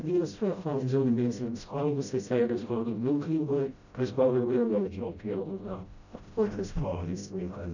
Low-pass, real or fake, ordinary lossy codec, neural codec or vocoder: 7.2 kHz; fake; none; codec, 16 kHz, 0.5 kbps, FreqCodec, smaller model